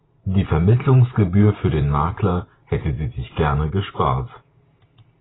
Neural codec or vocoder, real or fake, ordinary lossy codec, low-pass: vocoder, 44.1 kHz, 128 mel bands every 512 samples, BigVGAN v2; fake; AAC, 16 kbps; 7.2 kHz